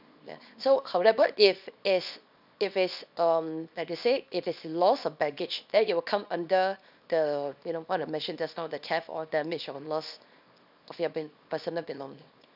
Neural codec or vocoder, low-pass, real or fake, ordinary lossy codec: codec, 24 kHz, 0.9 kbps, WavTokenizer, small release; 5.4 kHz; fake; none